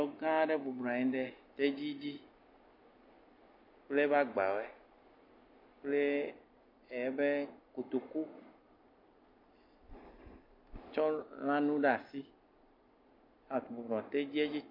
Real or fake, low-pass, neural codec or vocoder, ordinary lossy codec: real; 5.4 kHz; none; MP3, 32 kbps